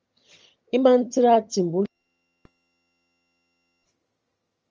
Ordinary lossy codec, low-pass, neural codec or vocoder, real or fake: Opus, 24 kbps; 7.2 kHz; vocoder, 22.05 kHz, 80 mel bands, HiFi-GAN; fake